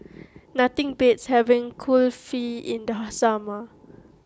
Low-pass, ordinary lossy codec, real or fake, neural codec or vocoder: none; none; real; none